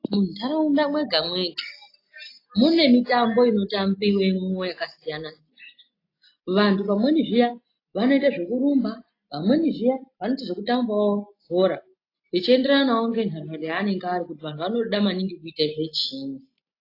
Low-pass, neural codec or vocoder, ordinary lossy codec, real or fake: 5.4 kHz; none; AAC, 32 kbps; real